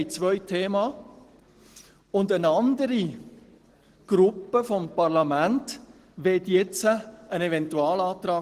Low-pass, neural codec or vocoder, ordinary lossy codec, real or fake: 14.4 kHz; none; Opus, 16 kbps; real